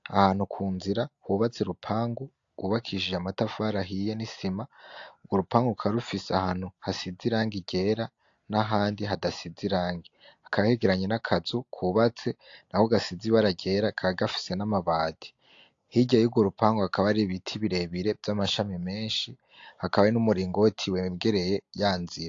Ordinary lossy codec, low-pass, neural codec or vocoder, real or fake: AAC, 48 kbps; 7.2 kHz; none; real